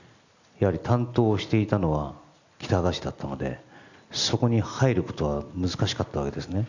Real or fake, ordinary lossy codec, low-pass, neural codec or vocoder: real; none; 7.2 kHz; none